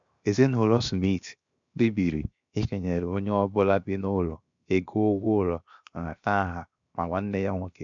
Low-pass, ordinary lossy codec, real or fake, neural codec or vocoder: 7.2 kHz; MP3, 64 kbps; fake; codec, 16 kHz, 0.7 kbps, FocalCodec